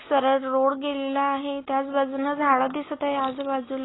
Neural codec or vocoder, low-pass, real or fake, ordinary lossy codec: none; 7.2 kHz; real; AAC, 16 kbps